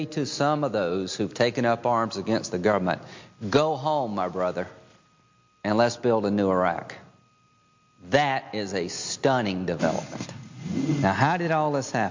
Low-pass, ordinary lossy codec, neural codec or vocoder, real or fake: 7.2 kHz; MP3, 48 kbps; none; real